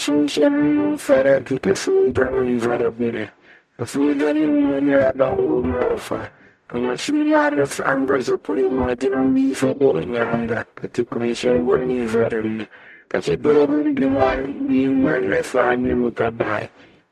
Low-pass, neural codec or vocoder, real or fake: 14.4 kHz; codec, 44.1 kHz, 0.9 kbps, DAC; fake